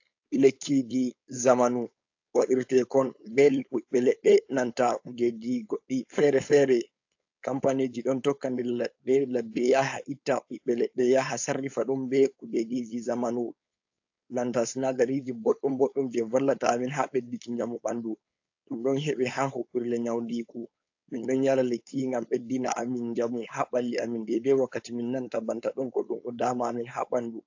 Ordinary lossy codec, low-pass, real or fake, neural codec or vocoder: AAC, 48 kbps; 7.2 kHz; fake; codec, 16 kHz, 4.8 kbps, FACodec